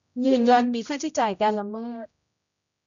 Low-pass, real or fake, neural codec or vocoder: 7.2 kHz; fake; codec, 16 kHz, 0.5 kbps, X-Codec, HuBERT features, trained on general audio